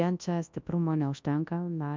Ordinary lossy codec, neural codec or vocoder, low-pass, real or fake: MP3, 64 kbps; codec, 24 kHz, 0.9 kbps, WavTokenizer, large speech release; 7.2 kHz; fake